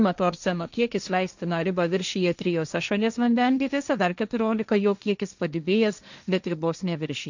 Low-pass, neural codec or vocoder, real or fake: 7.2 kHz; codec, 16 kHz, 1.1 kbps, Voila-Tokenizer; fake